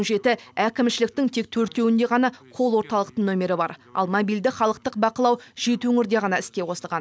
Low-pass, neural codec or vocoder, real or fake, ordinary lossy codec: none; none; real; none